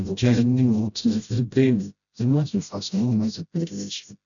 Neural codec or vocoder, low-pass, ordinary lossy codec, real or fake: codec, 16 kHz, 0.5 kbps, FreqCodec, smaller model; 7.2 kHz; none; fake